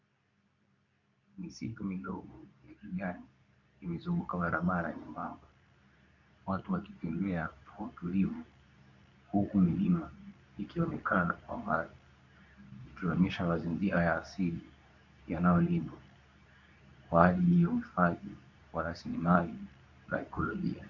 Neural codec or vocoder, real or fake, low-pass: codec, 24 kHz, 0.9 kbps, WavTokenizer, medium speech release version 2; fake; 7.2 kHz